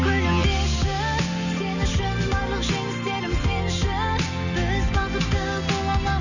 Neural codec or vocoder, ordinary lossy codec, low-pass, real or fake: none; none; 7.2 kHz; real